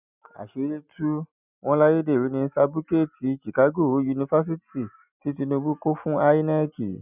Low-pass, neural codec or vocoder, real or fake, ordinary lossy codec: 3.6 kHz; none; real; none